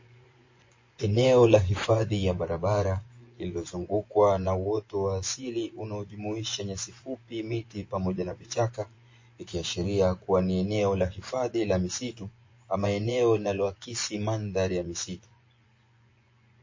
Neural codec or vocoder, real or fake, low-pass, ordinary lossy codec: vocoder, 24 kHz, 100 mel bands, Vocos; fake; 7.2 kHz; MP3, 32 kbps